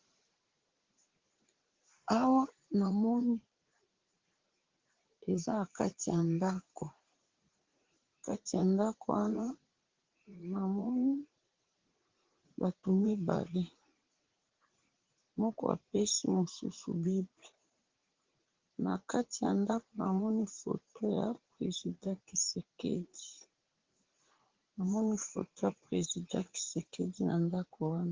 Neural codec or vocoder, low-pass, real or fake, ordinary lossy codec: vocoder, 44.1 kHz, 80 mel bands, Vocos; 7.2 kHz; fake; Opus, 16 kbps